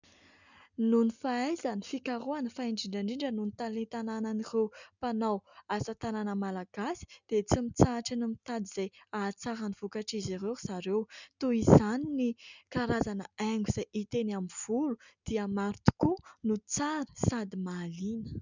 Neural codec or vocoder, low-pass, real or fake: none; 7.2 kHz; real